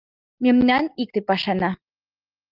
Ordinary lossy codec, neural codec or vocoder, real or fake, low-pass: Opus, 32 kbps; none; real; 5.4 kHz